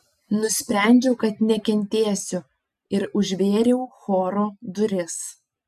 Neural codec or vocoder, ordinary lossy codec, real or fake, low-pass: none; AAC, 96 kbps; real; 14.4 kHz